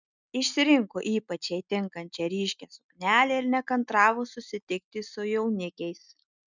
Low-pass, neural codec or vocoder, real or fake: 7.2 kHz; none; real